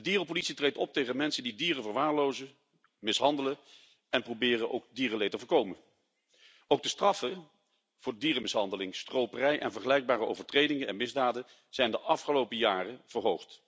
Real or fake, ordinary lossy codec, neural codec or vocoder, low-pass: real; none; none; none